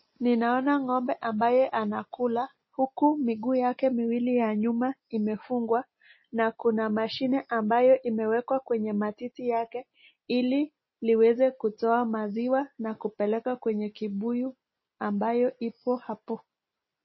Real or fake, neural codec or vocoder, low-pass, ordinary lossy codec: real; none; 7.2 kHz; MP3, 24 kbps